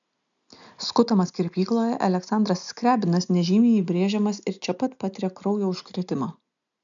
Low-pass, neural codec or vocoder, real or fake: 7.2 kHz; none; real